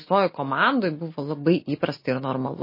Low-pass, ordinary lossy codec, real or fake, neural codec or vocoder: 5.4 kHz; MP3, 24 kbps; real; none